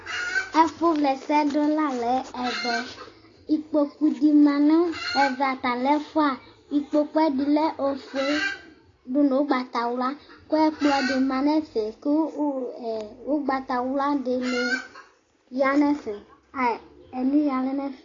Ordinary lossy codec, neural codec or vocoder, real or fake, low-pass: AAC, 32 kbps; none; real; 7.2 kHz